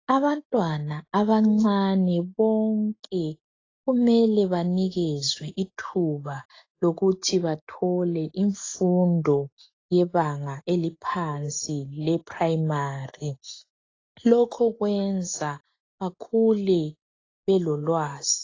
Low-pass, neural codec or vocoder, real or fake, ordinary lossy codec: 7.2 kHz; none; real; AAC, 32 kbps